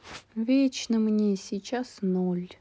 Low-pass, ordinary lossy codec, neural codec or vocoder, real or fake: none; none; none; real